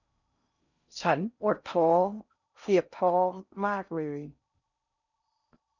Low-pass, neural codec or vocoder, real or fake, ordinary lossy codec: 7.2 kHz; codec, 16 kHz in and 24 kHz out, 0.6 kbps, FocalCodec, streaming, 4096 codes; fake; none